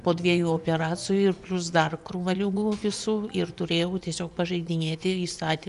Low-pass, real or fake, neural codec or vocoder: 10.8 kHz; fake; vocoder, 24 kHz, 100 mel bands, Vocos